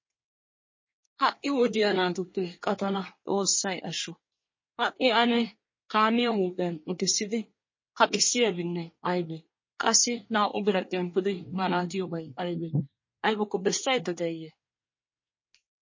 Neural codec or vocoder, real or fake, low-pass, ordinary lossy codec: codec, 24 kHz, 1 kbps, SNAC; fake; 7.2 kHz; MP3, 32 kbps